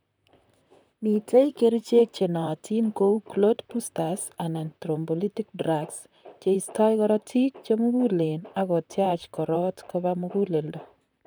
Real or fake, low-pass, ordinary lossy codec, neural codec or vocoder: fake; none; none; vocoder, 44.1 kHz, 128 mel bands, Pupu-Vocoder